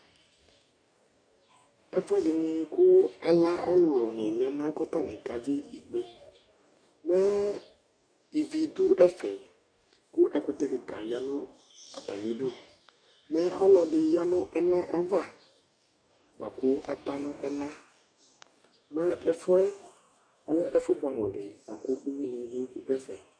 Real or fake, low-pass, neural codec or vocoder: fake; 9.9 kHz; codec, 44.1 kHz, 2.6 kbps, DAC